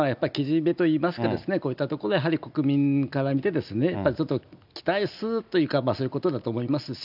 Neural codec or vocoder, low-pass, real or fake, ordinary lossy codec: none; 5.4 kHz; real; none